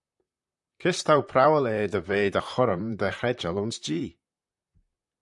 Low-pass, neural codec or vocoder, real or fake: 10.8 kHz; vocoder, 44.1 kHz, 128 mel bands, Pupu-Vocoder; fake